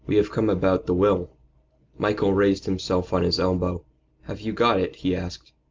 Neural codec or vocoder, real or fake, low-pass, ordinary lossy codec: none; real; 7.2 kHz; Opus, 32 kbps